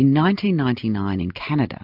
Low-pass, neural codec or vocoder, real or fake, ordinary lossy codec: 5.4 kHz; none; real; MP3, 48 kbps